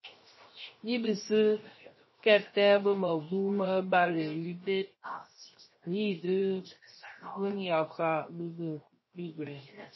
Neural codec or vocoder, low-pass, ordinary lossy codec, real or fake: codec, 16 kHz, 0.7 kbps, FocalCodec; 7.2 kHz; MP3, 24 kbps; fake